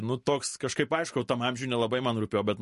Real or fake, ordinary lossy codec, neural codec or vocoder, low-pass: real; MP3, 48 kbps; none; 14.4 kHz